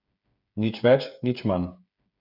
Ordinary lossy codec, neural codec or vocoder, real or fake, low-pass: none; codec, 16 kHz, 8 kbps, FreqCodec, smaller model; fake; 5.4 kHz